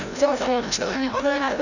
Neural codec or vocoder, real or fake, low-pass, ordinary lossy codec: codec, 16 kHz, 0.5 kbps, FreqCodec, larger model; fake; 7.2 kHz; none